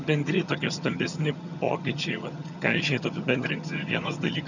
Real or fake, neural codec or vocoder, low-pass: fake; vocoder, 22.05 kHz, 80 mel bands, HiFi-GAN; 7.2 kHz